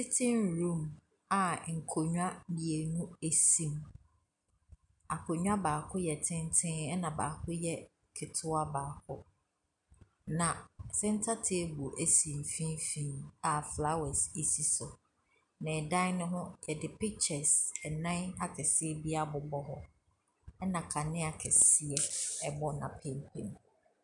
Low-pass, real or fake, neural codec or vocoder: 10.8 kHz; real; none